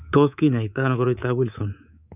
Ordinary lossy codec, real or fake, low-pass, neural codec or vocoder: none; fake; 3.6 kHz; codec, 24 kHz, 3.1 kbps, DualCodec